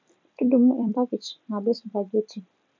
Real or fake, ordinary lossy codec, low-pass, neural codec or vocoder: fake; MP3, 64 kbps; 7.2 kHz; vocoder, 44.1 kHz, 128 mel bands every 256 samples, BigVGAN v2